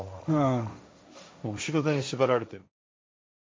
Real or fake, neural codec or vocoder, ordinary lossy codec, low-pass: fake; codec, 16 kHz, 1.1 kbps, Voila-Tokenizer; none; none